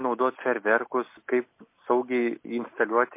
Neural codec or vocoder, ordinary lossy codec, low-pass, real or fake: none; MP3, 24 kbps; 3.6 kHz; real